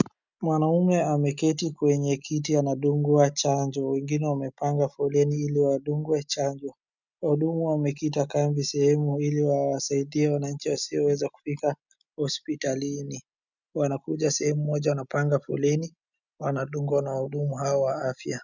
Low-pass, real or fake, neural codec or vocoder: 7.2 kHz; real; none